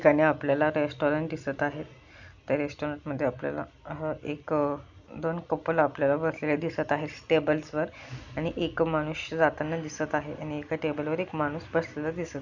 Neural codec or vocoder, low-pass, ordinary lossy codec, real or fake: none; 7.2 kHz; none; real